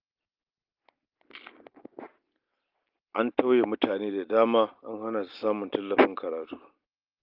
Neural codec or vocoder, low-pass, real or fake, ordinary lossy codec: none; 5.4 kHz; real; Opus, 32 kbps